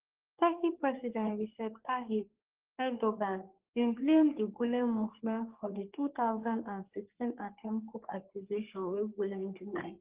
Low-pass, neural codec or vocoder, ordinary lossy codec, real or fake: 3.6 kHz; codec, 44.1 kHz, 3.4 kbps, Pupu-Codec; Opus, 16 kbps; fake